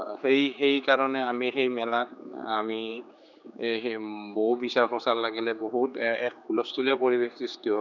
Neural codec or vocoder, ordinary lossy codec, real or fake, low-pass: codec, 16 kHz, 4 kbps, X-Codec, HuBERT features, trained on general audio; none; fake; 7.2 kHz